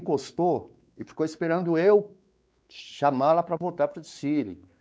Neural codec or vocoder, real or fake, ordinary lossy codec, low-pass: codec, 16 kHz, 4 kbps, X-Codec, WavLM features, trained on Multilingual LibriSpeech; fake; none; none